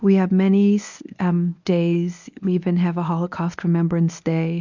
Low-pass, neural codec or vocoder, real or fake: 7.2 kHz; codec, 24 kHz, 0.9 kbps, WavTokenizer, medium speech release version 1; fake